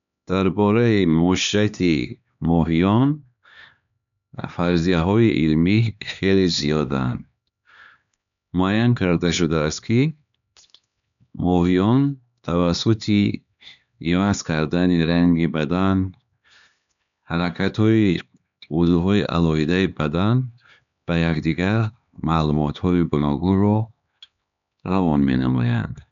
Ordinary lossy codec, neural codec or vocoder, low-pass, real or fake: none; codec, 16 kHz, 2 kbps, X-Codec, HuBERT features, trained on LibriSpeech; 7.2 kHz; fake